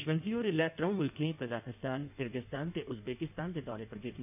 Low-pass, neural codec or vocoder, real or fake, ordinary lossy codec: 3.6 kHz; codec, 16 kHz in and 24 kHz out, 1.1 kbps, FireRedTTS-2 codec; fake; none